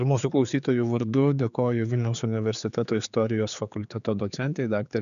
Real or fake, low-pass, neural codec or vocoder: fake; 7.2 kHz; codec, 16 kHz, 4 kbps, X-Codec, HuBERT features, trained on general audio